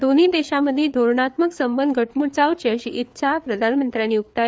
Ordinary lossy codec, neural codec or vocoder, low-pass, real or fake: none; codec, 16 kHz, 4 kbps, FreqCodec, larger model; none; fake